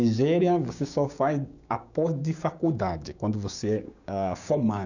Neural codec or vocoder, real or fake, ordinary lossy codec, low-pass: codec, 16 kHz, 6 kbps, DAC; fake; none; 7.2 kHz